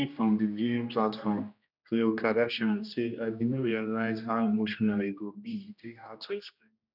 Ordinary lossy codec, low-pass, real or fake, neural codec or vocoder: none; 5.4 kHz; fake; codec, 16 kHz, 1 kbps, X-Codec, HuBERT features, trained on general audio